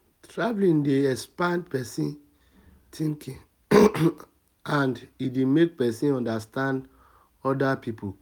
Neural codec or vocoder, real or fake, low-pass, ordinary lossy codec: none; real; none; none